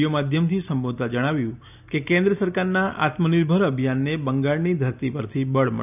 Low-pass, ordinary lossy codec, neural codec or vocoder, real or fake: 3.6 kHz; none; none; real